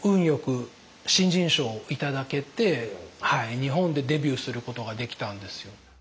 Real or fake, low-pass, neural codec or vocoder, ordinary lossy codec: real; none; none; none